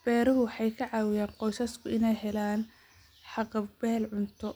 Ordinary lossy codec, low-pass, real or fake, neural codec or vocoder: none; none; real; none